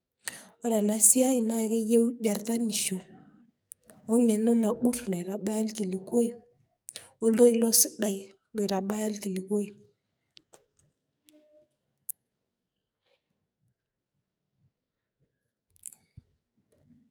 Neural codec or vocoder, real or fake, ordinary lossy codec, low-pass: codec, 44.1 kHz, 2.6 kbps, SNAC; fake; none; none